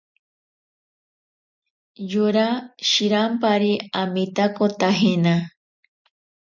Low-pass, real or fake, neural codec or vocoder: 7.2 kHz; real; none